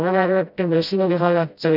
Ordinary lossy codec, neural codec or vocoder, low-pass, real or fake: none; codec, 16 kHz, 0.5 kbps, FreqCodec, smaller model; 5.4 kHz; fake